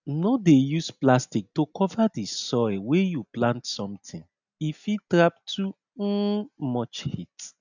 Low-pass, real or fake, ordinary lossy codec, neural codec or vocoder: 7.2 kHz; real; none; none